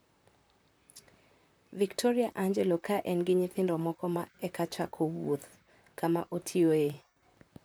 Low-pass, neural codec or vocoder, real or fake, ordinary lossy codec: none; vocoder, 44.1 kHz, 128 mel bands, Pupu-Vocoder; fake; none